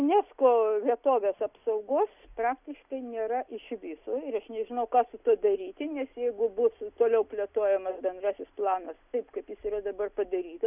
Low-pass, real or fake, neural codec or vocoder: 3.6 kHz; real; none